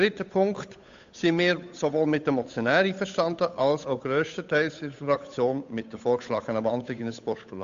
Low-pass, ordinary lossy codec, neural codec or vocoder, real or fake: 7.2 kHz; AAC, 64 kbps; codec, 16 kHz, 8 kbps, FunCodec, trained on Chinese and English, 25 frames a second; fake